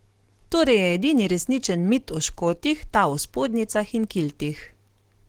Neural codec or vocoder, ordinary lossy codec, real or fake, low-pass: codec, 44.1 kHz, 7.8 kbps, DAC; Opus, 16 kbps; fake; 19.8 kHz